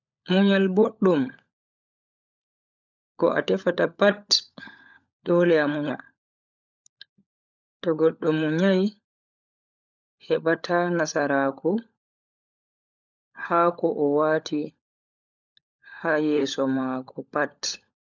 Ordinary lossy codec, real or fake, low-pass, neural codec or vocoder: none; fake; 7.2 kHz; codec, 16 kHz, 16 kbps, FunCodec, trained on LibriTTS, 50 frames a second